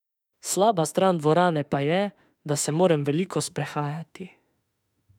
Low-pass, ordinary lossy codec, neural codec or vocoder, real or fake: 19.8 kHz; none; autoencoder, 48 kHz, 32 numbers a frame, DAC-VAE, trained on Japanese speech; fake